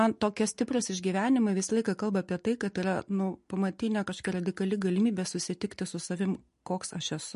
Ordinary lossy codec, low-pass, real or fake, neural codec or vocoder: MP3, 48 kbps; 10.8 kHz; real; none